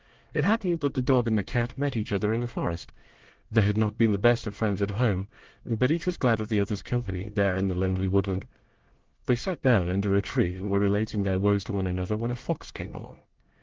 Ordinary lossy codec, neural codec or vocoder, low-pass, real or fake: Opus, 16 kbps; codec, 24 kHz, 1 kbps, SNAC; 7.2 kHz; fake